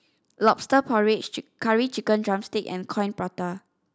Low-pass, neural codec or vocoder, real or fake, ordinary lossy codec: none; none; real; none